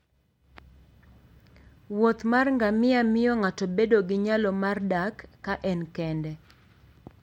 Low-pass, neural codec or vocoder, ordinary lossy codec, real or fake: 19.8 kHz; none; MP3, 64 kbps; real